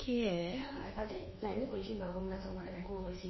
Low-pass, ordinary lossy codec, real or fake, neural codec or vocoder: 7.2 kHz; MP3, 24 kbps; fake; codec, 24 kHz, 1.2 kbps, DualCodec